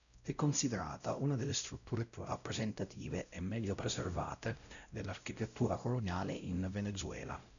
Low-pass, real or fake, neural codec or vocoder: 7.2 kHz; fake; codec, 16 kHz, 0.5 kbps, X-Codec, WavLM features, trained on Multilingual LibriSpeech